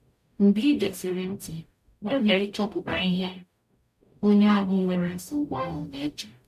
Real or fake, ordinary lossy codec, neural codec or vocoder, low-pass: fake; none; codec, 44.1 kHz, 0.9 kbps, DAC; 14.4 kHz